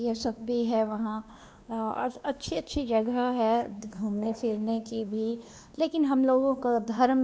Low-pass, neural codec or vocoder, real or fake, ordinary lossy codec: none; codec, 16 kHz, 2 kbps, X-Codec, WavLM features, trained on Multilingual LibriSpeech; fake; none